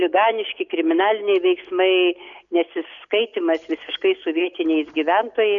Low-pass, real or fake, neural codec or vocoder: 7.2 kHz; real; none